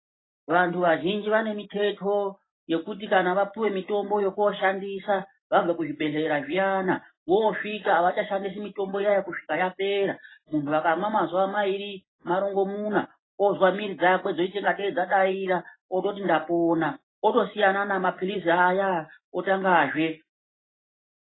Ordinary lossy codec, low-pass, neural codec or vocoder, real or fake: AAC, 16 kbps; 7.2 kHz; none; real